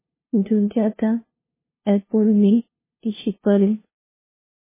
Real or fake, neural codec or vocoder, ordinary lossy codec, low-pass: fake; codec, 16 kHz, 0.5 kbps, FunCodec, trained on LibriTTS, 25 frames a second; MP3, 16 kbps; 3.6 kHz